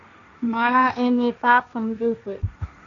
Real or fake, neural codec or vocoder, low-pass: fake; codec, 16 kHz, 1.1 kbps, Voila-Tokenizer; 7.2 kHz